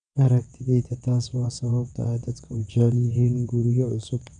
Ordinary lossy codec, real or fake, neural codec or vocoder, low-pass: none; fake; vocoder, 22.05 kHz, 80 mel bands, WaveNeXt; 9.9 kHz